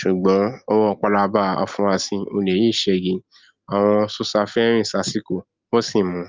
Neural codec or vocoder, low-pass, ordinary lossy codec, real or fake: none; 7.2 kHz; Opus, 32 kbps; real